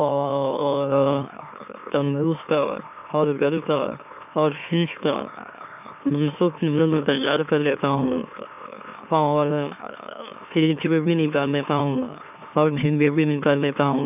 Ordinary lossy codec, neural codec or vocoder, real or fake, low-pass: none; autoencoder, 44.1 kHz, a latent of 192 numbers a frame, MeloTTS; fake; 3.6 kHz